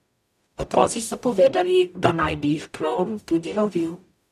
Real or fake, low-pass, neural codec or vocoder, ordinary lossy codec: fake; 14.4 kHz; codec, 44.1 kHz, 0.9 kbps, DAC; none